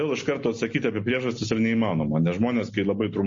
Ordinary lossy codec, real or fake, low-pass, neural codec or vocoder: MP3, 32 kbps; real; 7.2 kHz; none